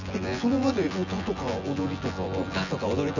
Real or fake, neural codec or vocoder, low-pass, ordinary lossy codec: fake; vocoder, 24 kHz, 100 mel bands, Vocos; 7.2 kHz; none